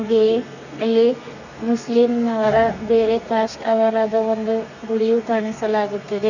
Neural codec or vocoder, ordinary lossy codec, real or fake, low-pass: codec, 32 kHz, 1.9 kbps, SNAC; none; fake; 7.2 kHz